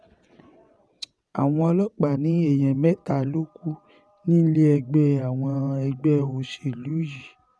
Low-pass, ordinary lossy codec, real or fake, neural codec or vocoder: none; none; fake; vocoder, 22.05 kHz, 80 mel bands, WaveNeXt